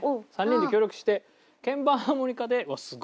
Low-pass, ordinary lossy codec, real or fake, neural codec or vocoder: none; none; real; none